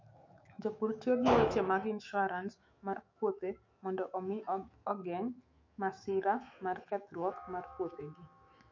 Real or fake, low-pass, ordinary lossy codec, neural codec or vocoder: fake; 7.2 kHz; MP3, 48 kbps; autoencoder, 48 kHz, 128 numbers a frame, DAC-VAE, trained on Japanese speech